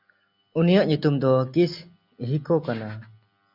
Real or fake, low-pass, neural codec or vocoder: real; 5.4 kHz; none